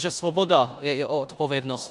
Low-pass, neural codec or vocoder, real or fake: 10.8 kHz; codec, 16 kHz in and 24 kHz out, 0.9 kbps, LongCat-Audio-Codec, four codebook decoder; fake